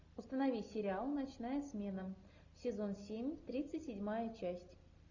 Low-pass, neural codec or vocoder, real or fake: 7.2 kHz; none; real